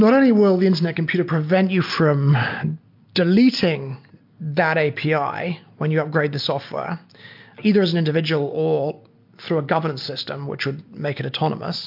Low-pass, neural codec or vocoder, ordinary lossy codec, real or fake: 5.4 kHz; none; MP3, 48 kbps; real